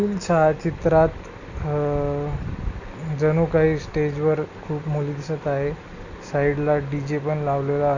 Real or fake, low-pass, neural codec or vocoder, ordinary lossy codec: real; 7.2 kHz; none; none